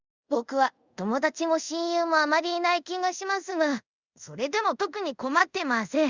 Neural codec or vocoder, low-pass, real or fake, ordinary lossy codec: codec, 24 kHz, 0.5 kbps, DualCodec; 7.2 kHz; fake; Opus, 64 kbps